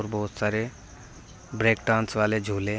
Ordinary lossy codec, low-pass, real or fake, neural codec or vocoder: none; none; real; none